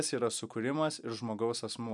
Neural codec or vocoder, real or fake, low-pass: none; real; 10.8 kHz